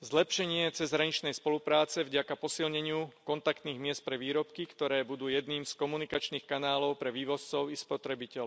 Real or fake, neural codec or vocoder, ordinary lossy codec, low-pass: real; none; none; none